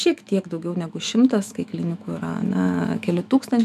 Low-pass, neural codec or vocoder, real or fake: 14.4 kHz; autoencoder, 48 kHz, 128 numbers a frame, DAC-VAE, trained on Japanese speech; fake